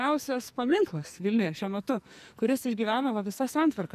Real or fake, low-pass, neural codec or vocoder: fake; 14.4 kHz; codec, 44.1 kHz, 2.6 kbps, SNAC